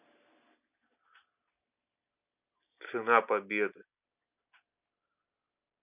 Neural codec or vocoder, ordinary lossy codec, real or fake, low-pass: none; none; real; 3.6 kHz